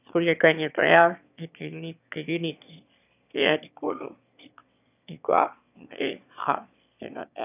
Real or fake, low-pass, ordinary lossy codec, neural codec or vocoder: fake; 3.6 kHz; none; autoencoder, 22.05 kHz, a latent of 192 numbers a frame, VITS, trained on one speaker